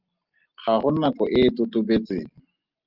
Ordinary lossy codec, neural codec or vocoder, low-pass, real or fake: Opus, 24 kbps; none; 5.4 kHz; real